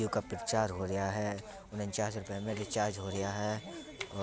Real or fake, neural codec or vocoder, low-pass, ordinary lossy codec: real; none; none; none